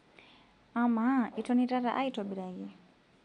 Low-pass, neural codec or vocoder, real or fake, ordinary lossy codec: 9.9 kHz; none; real; none